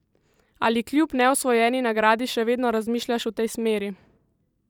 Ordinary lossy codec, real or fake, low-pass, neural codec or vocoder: none; fake; 19.8 kHz; vocoder, 44.1 kHz, 128 mel bands every 512 samples, BigVGAN v2